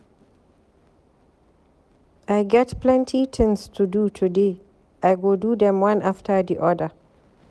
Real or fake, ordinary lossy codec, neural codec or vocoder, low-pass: real; none; none; none